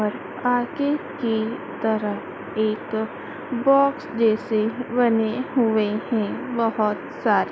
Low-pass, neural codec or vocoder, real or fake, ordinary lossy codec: none; none; real; none